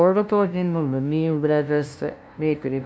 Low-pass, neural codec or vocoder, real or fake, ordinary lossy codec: none; codec, 16 kHz, 0.5 kbps, FunCodec, trained on LibriTTS, 25 frames a second; fake; none